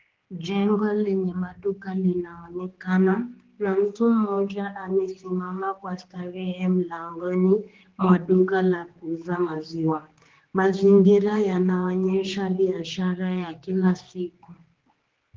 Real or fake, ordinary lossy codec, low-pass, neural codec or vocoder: fake; Opus, 16 kbps; 7.2 kHz; codec, 16 kHz, 2 kbps, X-Codec, HuBERT features, trained on general audio